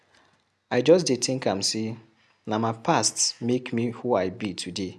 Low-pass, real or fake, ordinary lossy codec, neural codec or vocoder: none; real; none; none